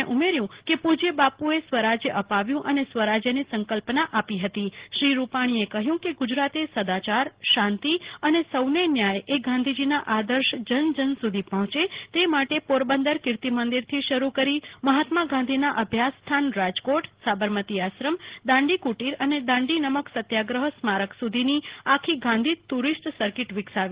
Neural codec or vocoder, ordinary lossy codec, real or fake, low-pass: none; Opus, 16 kbps; real; 3.6 kHz